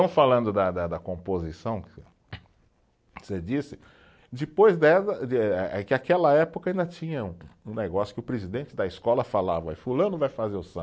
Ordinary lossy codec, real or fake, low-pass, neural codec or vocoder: none; real; none; none